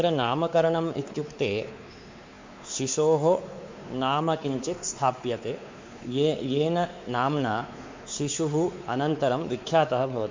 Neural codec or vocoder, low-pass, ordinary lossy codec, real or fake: codec, 16 kHz, 4 kbps, X-Codec, WavLM features, trained on Multilingual LibriSpeech; 7.2 kHz; MP3, 48 kbps; fake